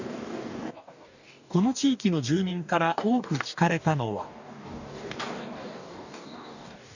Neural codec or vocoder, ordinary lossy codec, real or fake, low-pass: codec, 44.1 kHz, 2.6 kbps, DAC; none; fake; 7.2 kHz